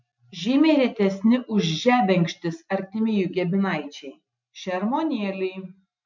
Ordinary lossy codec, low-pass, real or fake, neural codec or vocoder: MP3, 64 kbps; 7.2 kHz; real; none